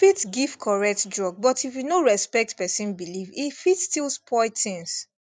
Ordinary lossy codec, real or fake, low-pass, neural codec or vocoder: none; fake; 9.9 kHz; vocoder, 44.1 kHz, 128 mel bands every 256 samples, BigVGAN v2